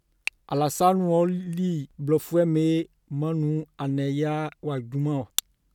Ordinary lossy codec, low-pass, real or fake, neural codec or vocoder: none; 19.8 kHz; real; none